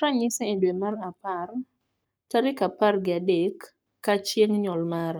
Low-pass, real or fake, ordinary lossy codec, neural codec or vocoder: none; fake; none; codec, 44.1 kHz, 7.8 kbps, Pupu-Codec